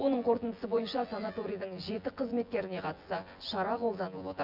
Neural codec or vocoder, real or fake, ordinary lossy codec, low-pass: vocoder, 24 kHz, 100 mel bands, Vocos; fake; MP3, 48 kbps; 5.4 kHz